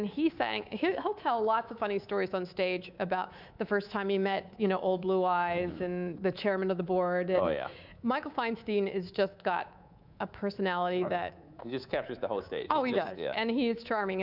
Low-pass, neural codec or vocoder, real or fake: 5.4 kHz; codec, 24 kHz, 3.1 kbps, DualCodec; fake